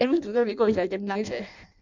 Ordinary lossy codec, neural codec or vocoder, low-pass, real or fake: none; codec, 16 kHz in and 24 kHz out, 0.6 kbps, FireRedTTS-2 codec; 7.2 kHz; fake